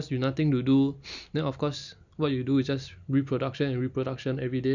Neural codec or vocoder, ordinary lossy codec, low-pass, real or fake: none; none; 7.2 kHz; real